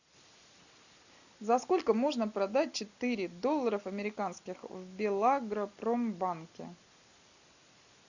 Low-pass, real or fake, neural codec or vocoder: 7.2 kHz; real; none